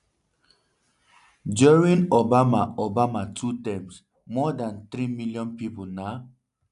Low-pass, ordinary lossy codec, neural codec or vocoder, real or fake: 10.8 kHz; AAC, 96 kbps; none; real